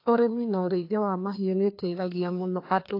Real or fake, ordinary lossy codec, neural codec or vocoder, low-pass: fake; AAC, 32 kbps; codec, 16 kHz, 2 kbps, FreqCodec, larger model; 5.4 kHz